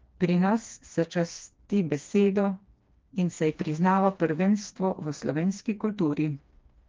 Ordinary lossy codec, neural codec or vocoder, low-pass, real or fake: Opus, 24 kbps; codec, 16 kHz, 2 kbps, FreqCodec, smaller model; 7.2 kHz; fake